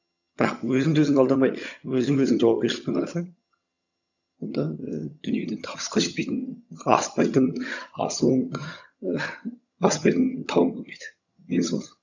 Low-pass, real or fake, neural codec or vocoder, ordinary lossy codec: 7.2 kHz; fake; vocoder, 22.05 kHz, 80 mel bands, HiFi-GAN; none